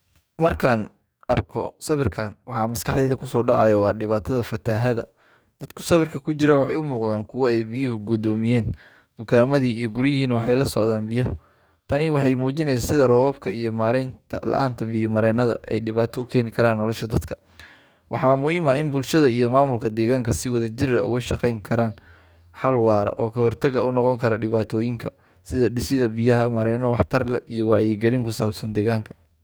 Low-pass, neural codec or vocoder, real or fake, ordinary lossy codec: none; codec, 44.1 kHz, 2.6 kbps, DAC; fake; none